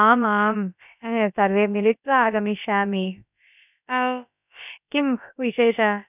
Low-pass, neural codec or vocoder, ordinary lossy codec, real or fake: 3.6 kHz; codec, 16 kHz, about 1 kbps, DyCAST, with the encoder's durations; none; fake